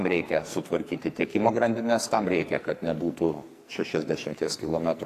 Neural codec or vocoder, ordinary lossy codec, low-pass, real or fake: codec, 44.1 kHz, 2.6 kbps, SNAC; AAC, 64 kbps; 14.4 kHz; fake